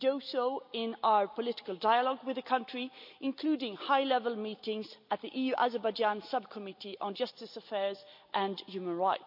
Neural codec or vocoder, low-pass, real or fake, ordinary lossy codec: none; 5.4 kHz; real; MP3, 48 kbps